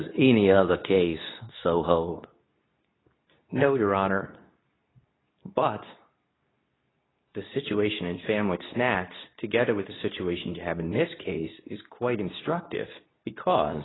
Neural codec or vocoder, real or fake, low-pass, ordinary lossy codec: codec, 24 kHz, 0.9 kbps, WavTokenizer, medium speech release version 2; fake; 7.2 kHz; AAC, 16 kbps